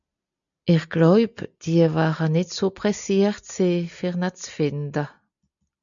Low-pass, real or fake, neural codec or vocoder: 7.2 kHz; real; none